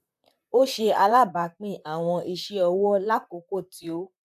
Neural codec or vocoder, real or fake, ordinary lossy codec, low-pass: vocoder, 44.1 kHz, 128 mel bands, Pupu-Vocoder; fake; AAC, 96 kbps; 14.4 kHz